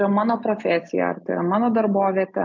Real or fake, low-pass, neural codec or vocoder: real; 7.2 kHz; none